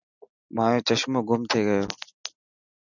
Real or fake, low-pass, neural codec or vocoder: real; 7.2 kHz; none